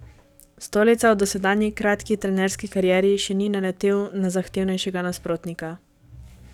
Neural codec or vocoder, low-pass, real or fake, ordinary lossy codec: codec, 44.1 kHz, 7.8 kbps, DAC; 19.8 kHz; fake; none